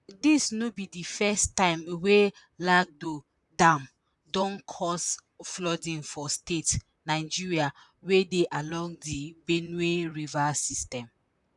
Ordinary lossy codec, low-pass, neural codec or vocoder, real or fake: none; 10.8 kHz; vocoder, 44.1 kHz, 128 mel bands, Pupu-Vocoder; fake